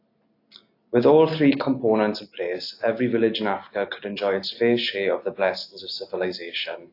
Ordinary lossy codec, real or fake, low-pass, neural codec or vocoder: AAC, 32 kbps; real; 5.4 kHz; none